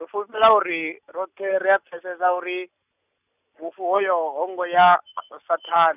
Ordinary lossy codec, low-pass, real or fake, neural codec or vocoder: none; 3.6 kHz; real; none